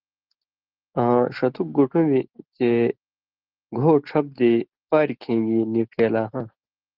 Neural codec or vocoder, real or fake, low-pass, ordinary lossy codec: none; real; 5.4 kHz; Opus, 16 kbps